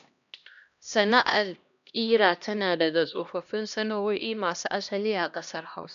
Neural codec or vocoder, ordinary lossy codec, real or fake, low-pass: codec, 16 kHz, 1 kbps, X-Codec, HuBERT features, trained on LibriSpeech; none; fake; 7.2 kHz